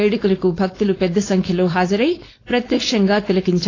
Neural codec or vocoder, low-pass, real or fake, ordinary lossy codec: codec, 16 kHz, 4.8 kbps, FACodec; 7.2 kHz; fake; AAC, 32 kbps